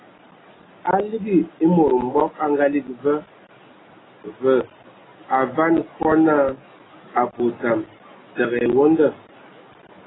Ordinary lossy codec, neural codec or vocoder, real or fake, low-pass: AAC, 16 kbps; none; real; 7.2 kHz